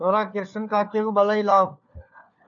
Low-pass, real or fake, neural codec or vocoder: 7.2 kHz; fake; codec, 16 kHz, 4 kbps, FunCodec, trained on LibriTTS, 50 frames a second